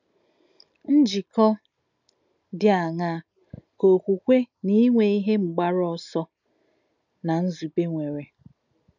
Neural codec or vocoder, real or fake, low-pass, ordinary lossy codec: none; real; 7.2 kHz; none